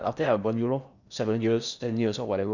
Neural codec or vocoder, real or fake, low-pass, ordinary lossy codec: codec, 16 kHz in and 24 kHz out, 0.8 kbps, FocalCodec, streaming, 65536 codes; fake; 7.2 kHz; none